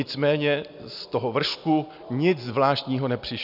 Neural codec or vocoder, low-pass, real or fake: none; 5.4 kHz; real